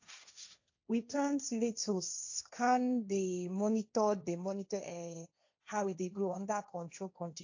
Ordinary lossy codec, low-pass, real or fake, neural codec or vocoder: AAC, 48 kbps; 7.2 kHz; fake; codec, 16 kHz, 1.1 kbps, Voila-Tokenizer